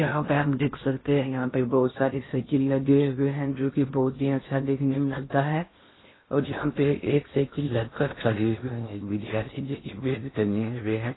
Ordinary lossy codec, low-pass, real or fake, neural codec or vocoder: AAC, 16 kbps; 7.2 kHz; fake; codec, 16 kHz in and 24 kHz out, 0.6 kbps, FocalCodec, streaming, 2048 codes